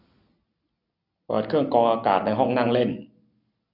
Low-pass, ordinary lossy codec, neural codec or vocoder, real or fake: 5.4 kHz; none; none; real